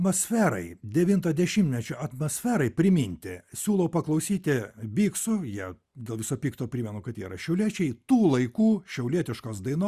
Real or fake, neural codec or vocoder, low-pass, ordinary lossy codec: real; none; 14.4 kHz; Opus, 64 kbps